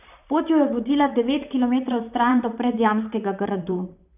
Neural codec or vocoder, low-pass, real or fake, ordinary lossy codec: vocoder, 44.1 kHz, 128 mel bands, Pupu-Vocoder; 3.6 kHz; fake; none